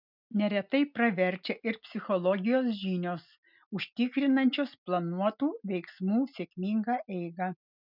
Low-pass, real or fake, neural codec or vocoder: 5.4 kHz; real; none